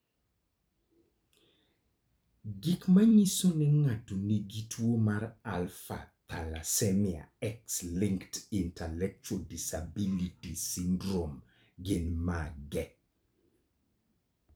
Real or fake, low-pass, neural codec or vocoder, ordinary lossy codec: fake; none; vocoder, 44.1 kHz, 128 mel bands every 256 samples, BigVGAN v2; none